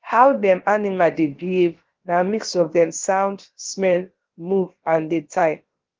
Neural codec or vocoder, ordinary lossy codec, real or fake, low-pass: codec, 16 kHz, about 1 kbps, DyCAST, with the encoder's durations; Opus, 16 kbps; fake; 7.2 kHz